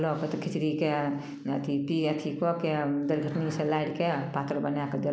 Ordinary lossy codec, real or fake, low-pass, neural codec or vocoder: none; real; none; none